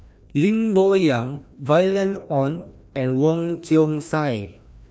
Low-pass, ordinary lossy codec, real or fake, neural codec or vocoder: none; none; fake; codec, 16 kHz, 1 kbps, FreqCodec, larger model